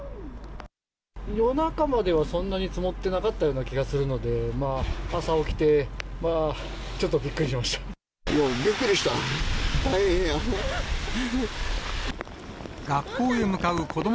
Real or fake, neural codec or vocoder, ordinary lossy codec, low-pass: real; none; none; none